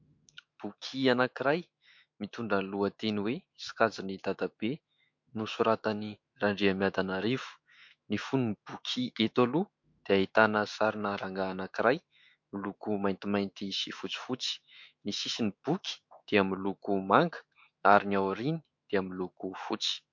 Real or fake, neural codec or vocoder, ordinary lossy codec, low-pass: fake; autoencoder, 48 kHz, 128 numbers a frame, DAC-VAE, trained on Japanese speech; MP3, 48 kbps; 7.2 kHz